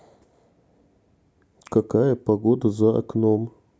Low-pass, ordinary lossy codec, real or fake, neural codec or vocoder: none; none; real; none